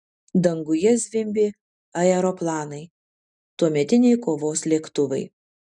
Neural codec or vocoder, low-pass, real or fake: none; 10.8 kHz; real